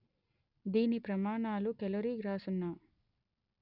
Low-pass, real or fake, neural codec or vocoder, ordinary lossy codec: 5.4 kHz; real; none; none